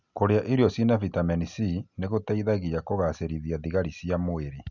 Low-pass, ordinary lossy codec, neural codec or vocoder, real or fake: 7.2 kHz; none; none; real